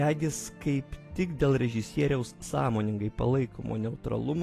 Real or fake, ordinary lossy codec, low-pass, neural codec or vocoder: real; AAC, 48 kbps; 14.4 kHz; none